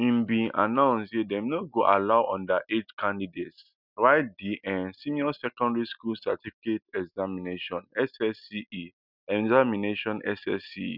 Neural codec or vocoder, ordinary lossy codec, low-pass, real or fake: none; none; 5.4 kHz; real